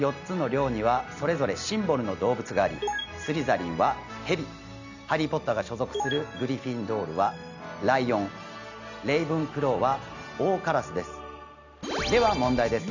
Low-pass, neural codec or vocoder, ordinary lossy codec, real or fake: 7.2 kHz; none; none; real